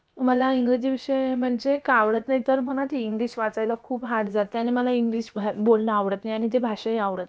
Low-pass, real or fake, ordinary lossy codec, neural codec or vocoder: none; fake; none; codec, 16 kHz, about 1 kbps, DyCAST, with the encoder's durations